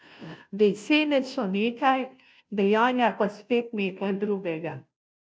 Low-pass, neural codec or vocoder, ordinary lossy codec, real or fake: none; codec, 16 kHz, 0.5 kbps, FunCodec, trained on Chinese and English, 25 frames a second; none; fake